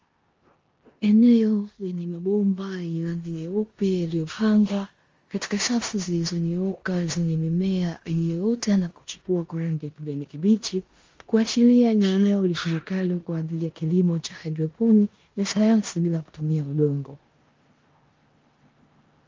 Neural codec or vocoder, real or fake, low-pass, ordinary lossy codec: codec, 16 kHz in and 24 kHz out, 0.9 kbps, LongCat-Audio-Codec, four codebook decoder; fake; 7.2 kHz; Opus, 32 kbps